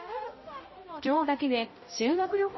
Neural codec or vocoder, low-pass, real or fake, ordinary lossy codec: codec, 16 kHz, 0.5 kbps, X-Codec, HuBERT features, trained on balanced general audio; 7.2 kHz; fake; MP3, 24 kbps